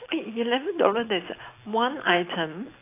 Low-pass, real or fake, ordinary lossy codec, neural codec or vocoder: 3.6 kHz; real; AAC, 24 kbps; none